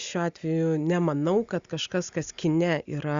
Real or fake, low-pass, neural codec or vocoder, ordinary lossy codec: real; 7.2 kHz; none; Opus, 64 kbps